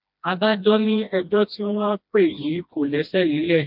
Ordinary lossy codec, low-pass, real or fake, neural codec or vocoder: MP3, 48 kbps; 5.4 kHz; fake; codec, 16 kHz, 1 kbps, FreqCodec, smaller model